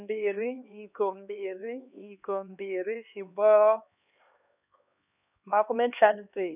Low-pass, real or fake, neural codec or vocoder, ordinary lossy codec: 3.6 kHz; fake; codec, 16 kHz, 2 kbps, X-Codec, HuBERT features, trained on LibriSpeech; none